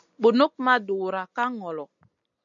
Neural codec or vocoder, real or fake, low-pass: none; real; 7.2 kHz